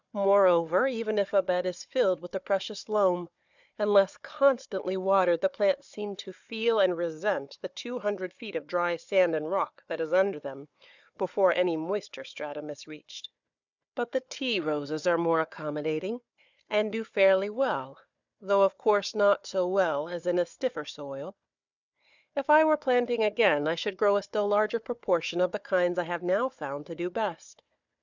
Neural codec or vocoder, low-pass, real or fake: codec, 16 kHz, 4 kbps, FunCodec, trained on Chinese and English, 50 frames a second; 7.2 kHz; fake